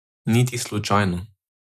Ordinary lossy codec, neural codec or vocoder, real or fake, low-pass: none; none; real; 14.4 kHz